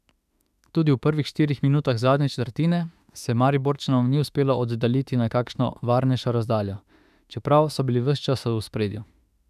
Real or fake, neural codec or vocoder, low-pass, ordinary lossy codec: fake; autoencoder, 48 kHz, 32 numbers a frame, DAC-VAE, trained on Japanese speech; 14.4 kHz; none